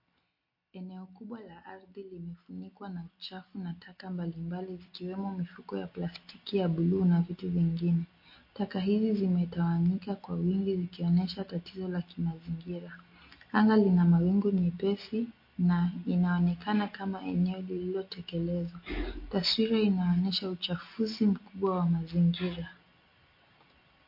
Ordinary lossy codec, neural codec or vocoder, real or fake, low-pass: MP3, 32 kbps; none; real; 5.4 kHz